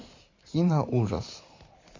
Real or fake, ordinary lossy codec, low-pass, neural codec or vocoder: fake; MP3, 48 kbps; 7.2 kHz; vocoder, 44.1 kHz, 128 mel bands every 512 samples, BigVGAN v2